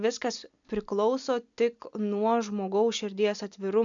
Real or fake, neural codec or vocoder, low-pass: real; none; 7.2 kHz